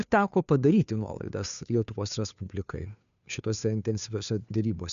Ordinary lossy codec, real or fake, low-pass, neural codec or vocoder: MP3, 96 kbps; fake; 7.2 kHz; codec, 16 kHz, 2 kbps, FunCodec, trained on Chinese and English, 25 frames a second